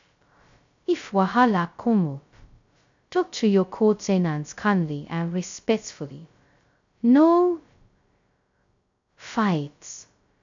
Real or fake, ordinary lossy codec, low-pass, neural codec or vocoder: fake; MP3, 64 kbps; 7.2 kHz; codec, 16 kHz, 0.2 kbps, FocalCodec